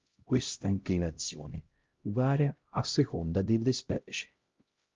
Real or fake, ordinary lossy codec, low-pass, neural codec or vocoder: fake; Opus, 32 kbps; 7.2 kHz; codec, 16 kHz, 0.5 kbps, X-Codec, HuBERT features, trained on LibriSpeech